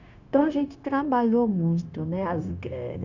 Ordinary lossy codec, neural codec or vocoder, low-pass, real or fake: none; codec, 16 kHz, 0.9 kbps, LongCat-Audio-Codec; 7.2 kHz; fake